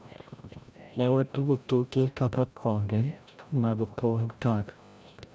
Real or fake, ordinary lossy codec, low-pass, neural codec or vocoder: fake; none; none; codec, 16 kHz, 0.5 kbps, FreqCodec, larger model